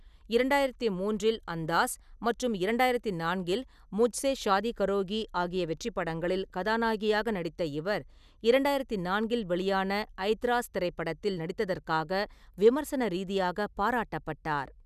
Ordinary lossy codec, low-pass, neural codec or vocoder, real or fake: none; 14.4 kHz; none; real